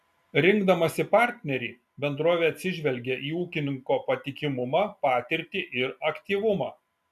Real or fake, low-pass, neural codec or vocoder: real; 14.4 kHz; none